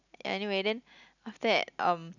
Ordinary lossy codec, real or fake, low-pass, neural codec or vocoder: none; real; 7.2 kHz; none